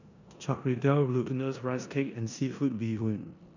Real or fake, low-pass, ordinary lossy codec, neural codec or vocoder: fake; 7.2 kHz; none; codec, 16 kHz in and 24 kHz out, 0.9 kbps, LongCat-Audio-Codec, four codebook decoder